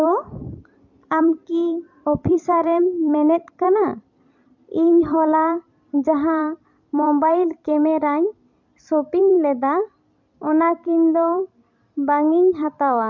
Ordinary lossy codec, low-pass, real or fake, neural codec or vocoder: MP3, 48 kbps; 7.2 kHz; fake; vocoder, 44.1 kHz, 128 mel bands every 256 samples, BigVGAN v2